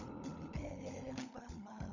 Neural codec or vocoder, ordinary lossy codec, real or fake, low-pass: codec, 16 kHz, 16 kbps, FunCodec, trained on LibriTTS, 50 frames a second; none; fake; 7.2 kHz